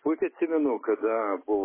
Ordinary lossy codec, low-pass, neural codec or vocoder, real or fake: MP3, 16 kbps; 3.6 kHz; none; real